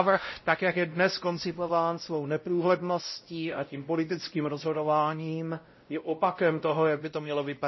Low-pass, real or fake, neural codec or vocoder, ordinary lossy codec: 7.2 kHz; fake; codec, 16 kHz, 0.5 kbps, X-Codec, WavLM features, trained on Multilingual LibriSpeech; MP3, 24 kbps